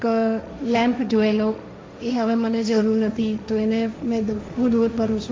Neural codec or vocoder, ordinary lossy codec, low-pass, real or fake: codec, 16 kHz, 1.1 kbps, Voila-Tokenizer; none; none; fake